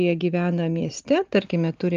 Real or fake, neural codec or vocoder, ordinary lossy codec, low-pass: real; none; Opus, 32 kbps; 7.2 kHz